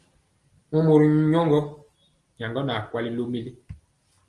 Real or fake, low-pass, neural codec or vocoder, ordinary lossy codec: real; 10.8 kHz; none; Opus, 24 kbps